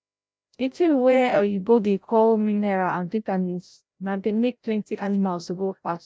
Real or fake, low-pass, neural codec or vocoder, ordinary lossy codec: fake; none; codec, 16 kHz, 0.5 kbps, FreqCodec, larger model; none